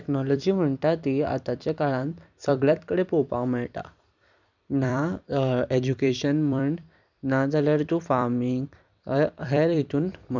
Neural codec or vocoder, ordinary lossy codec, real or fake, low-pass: vocoder, 44.1 kHz, 128 mel bands every 256 samples, BigVGAN v2; none; fake; 7.2 kHz